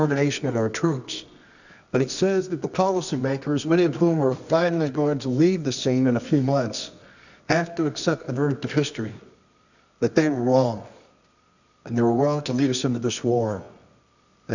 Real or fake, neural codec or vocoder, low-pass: fake; codec, 24 kHz, 0.9 kbps, WavTokenizer, medium music audio release; 7.2 kHz